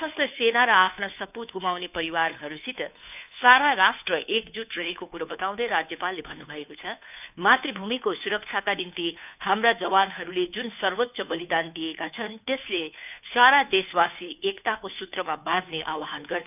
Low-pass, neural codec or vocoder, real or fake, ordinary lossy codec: 3.6 kHz; codec, 16 kHz, 2 kbps, FunCodec, trained on Chinese and English, 25 frames a second; fake; none